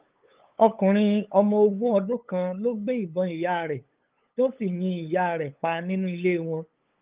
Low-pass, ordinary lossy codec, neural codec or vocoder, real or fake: 3.6 kHz; Opus, 32 kbps; codec, 16 kHz, 8 kbps, FunCodec, trained on LibriTTS, 25 frames a second; fake